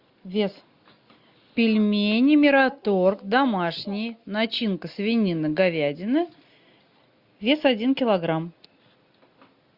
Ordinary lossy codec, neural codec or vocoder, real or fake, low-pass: Opus, 64 kbps; none; real; 5.4 kHz